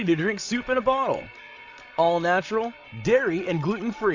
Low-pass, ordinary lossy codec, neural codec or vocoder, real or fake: 7.2 kHz; AAC, 48 kbps; codec, 16 kHz, 16 kbps, FreqCodec, larger model; fake